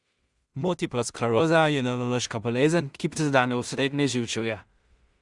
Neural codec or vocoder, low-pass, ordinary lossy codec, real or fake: codec, 16 kHz in and 24 kHz out, 0.4 kbps, LongCat-Audio-Codec, two codebook decoder; 10.8 kHz; Opus, 64 kbps; fake